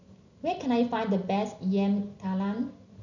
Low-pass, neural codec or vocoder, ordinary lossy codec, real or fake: 7.2 kHz; none; none; real